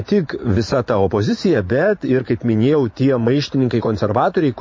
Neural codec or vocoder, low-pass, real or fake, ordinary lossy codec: vocoder, 44.1 kHz, 80 mel bands, Vocos; 7.2 kHz; fake; MP3, 32 kbps